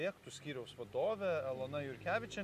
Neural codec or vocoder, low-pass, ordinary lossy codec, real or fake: none; 14.4 kHz; AAC, 96 kbps; real